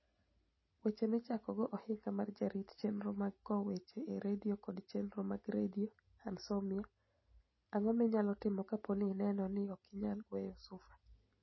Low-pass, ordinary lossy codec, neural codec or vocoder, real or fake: 7.2 kHz; MP3, 24 kbps; none; real